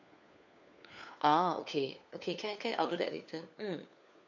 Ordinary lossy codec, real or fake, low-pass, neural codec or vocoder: none; fake; 7.2 kHz; codec, 16 kHz, 4 kbps, FunCodec, trained on LibriTTS, 50 frames a second